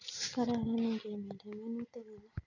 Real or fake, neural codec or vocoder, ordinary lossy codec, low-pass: real; none; none; 7.2 kHz